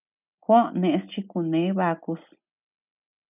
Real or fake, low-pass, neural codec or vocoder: real; 3.6 kHz; none